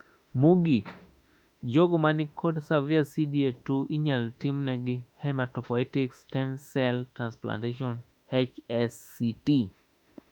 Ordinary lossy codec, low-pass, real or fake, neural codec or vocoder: none; 19.8 kHz; fake; autoencoder, 48 kHz, 32 numbers a frame, DAC-VAE, trained on Japanese speech